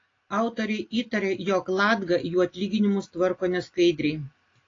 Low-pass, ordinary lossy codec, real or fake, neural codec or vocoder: 7.2 kHz; AAC, 32 kbps; real; none